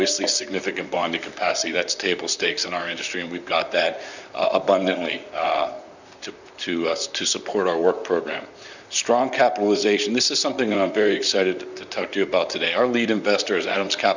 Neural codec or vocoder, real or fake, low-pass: vocoder, 44.1 kHz, 128 mel bands, Pupu-Vocoder; fake; 7.2 kHz